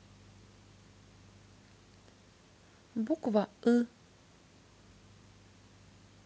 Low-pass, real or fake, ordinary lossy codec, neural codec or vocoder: none; real; none; none